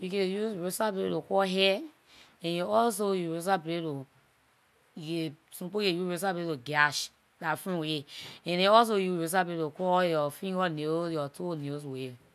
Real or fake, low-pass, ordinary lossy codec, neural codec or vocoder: real; 14.4 kHz; none; none